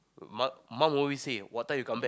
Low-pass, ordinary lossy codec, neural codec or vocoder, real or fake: none; none; none; real